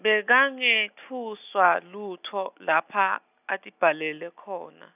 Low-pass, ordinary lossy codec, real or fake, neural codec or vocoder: 3.6 kHz; none; real; none